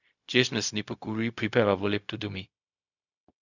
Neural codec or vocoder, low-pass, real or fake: codec, 16 kHz, 0.4 kbps, LongCat-Audio-Codec; 7.2 kHz; fake